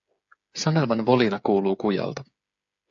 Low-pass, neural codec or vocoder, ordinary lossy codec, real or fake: 7.2 kHz; codec, 16 kHz, 8 kbps, FreqCodec, smaller model; AAC, 64 kbps; fake